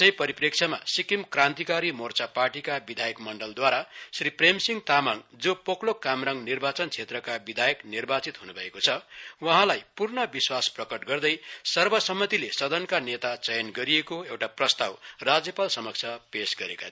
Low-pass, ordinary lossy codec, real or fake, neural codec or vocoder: none; none; real; none